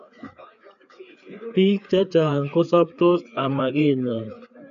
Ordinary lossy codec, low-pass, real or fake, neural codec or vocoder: none; 7.2 kHz; fake; codec, 16 kHz, 4 kbps, FreqCodec, larger model